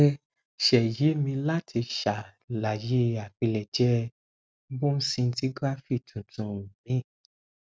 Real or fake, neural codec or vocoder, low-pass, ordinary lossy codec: real; none; none; none